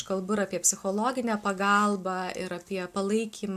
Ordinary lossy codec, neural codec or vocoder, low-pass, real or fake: AAC, 96 kbps; none; 14.4 kHz; real